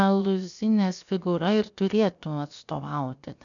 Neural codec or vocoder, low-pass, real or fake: codec, 16 kHz, 0.7 kbps, FocalCodec; 7.2 kHz; fake